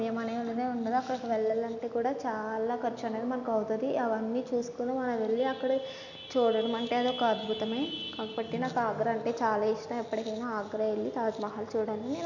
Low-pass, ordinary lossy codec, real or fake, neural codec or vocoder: 7.2 kHz; none; real; none